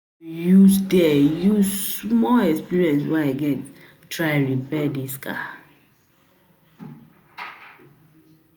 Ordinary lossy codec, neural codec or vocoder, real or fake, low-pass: none; none; real; none